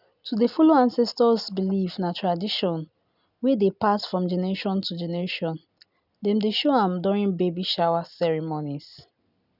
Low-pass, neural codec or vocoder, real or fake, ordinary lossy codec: 5.4 kHz; none; real; none